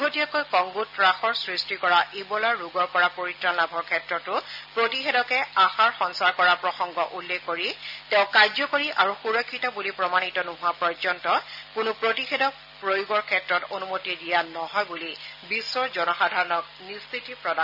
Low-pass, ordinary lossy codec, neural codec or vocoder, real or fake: 5.4 kHz; none; none; real